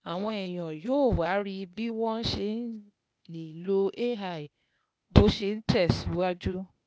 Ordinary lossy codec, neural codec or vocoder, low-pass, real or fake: none; codec, 16 kHz, 0.8 kbps, ZipCodec; none; fake